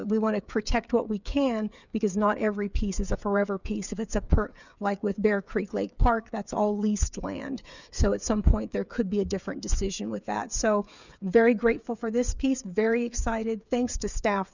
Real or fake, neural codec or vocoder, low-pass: fake; codec, 16 kHz, 8 kbps, FreqCodec, smaller model; 7.2 kHz